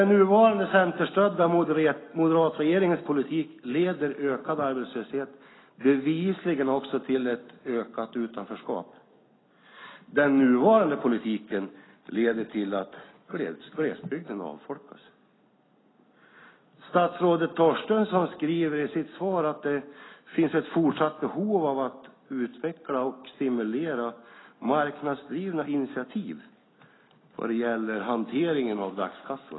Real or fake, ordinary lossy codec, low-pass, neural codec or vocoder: real; AAC, 16 kbps; 7.2 kHz; none